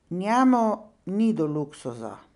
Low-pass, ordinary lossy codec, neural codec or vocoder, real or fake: 10.8 kHz; none; none; real